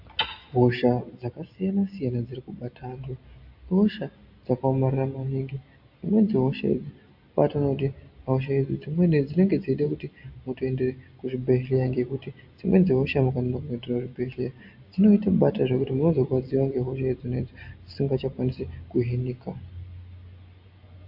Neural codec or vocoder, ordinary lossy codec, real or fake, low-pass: none; AAC, 48 kbps; real; 5.4 kHz